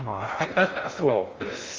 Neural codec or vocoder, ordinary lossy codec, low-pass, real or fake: codec, 16 kHz in and 24 kHz out, 0.6 kbps, FocalCodec, streaming, 2048 codes; Opus, 32 kbps; 7.2 kHz; fake